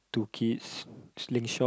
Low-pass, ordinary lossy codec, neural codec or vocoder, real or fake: none; none; none; real